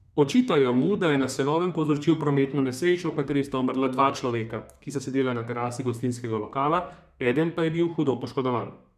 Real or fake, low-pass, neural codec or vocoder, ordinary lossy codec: fake; 14.4 kHz; codec, 32 kHz, 1.9 kbps, SNAC; none